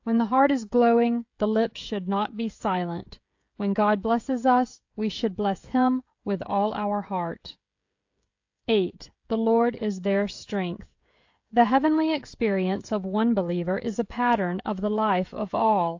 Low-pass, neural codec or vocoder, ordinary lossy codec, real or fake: 7.2 kHz; codec, 16 kHz, 16 kbps, FreqCodec, smaller model; AAC, 48 kbps; fake